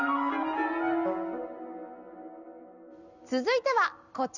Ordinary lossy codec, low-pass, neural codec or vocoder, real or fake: none; 7.2 kHz; none; real